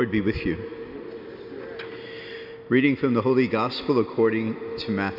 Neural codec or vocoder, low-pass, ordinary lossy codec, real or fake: none; 5.4 kHz; AAC, 48 kbps; real